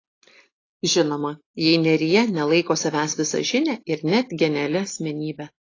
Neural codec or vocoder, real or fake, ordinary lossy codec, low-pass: none; real; AAC, 32 kbps; 7.2 kHz